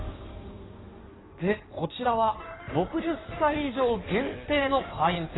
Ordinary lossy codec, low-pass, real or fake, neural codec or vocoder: AAC, 16 kbps; 7.2 kHz; fake; codec, 16 kHz in and 24 kHz out, 1.1 kbps, FireRedTTS-2 codec